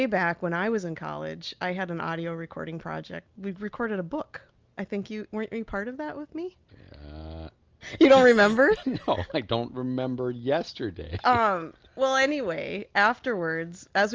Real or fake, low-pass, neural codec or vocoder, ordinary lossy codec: real; 7.2 kHz; none; Opus, 24 kbps